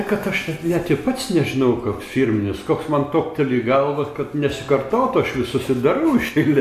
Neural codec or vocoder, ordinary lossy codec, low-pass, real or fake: none; AAC, 96 kbps; 14.4 kHz; real